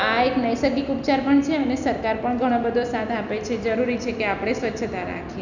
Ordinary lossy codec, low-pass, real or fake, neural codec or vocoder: none; 7.2 kHz; real; none